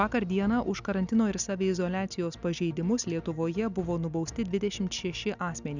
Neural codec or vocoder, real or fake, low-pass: none; real; 7.2 kHz